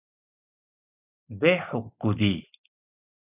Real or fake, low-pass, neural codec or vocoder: real; 3.6 kHz; none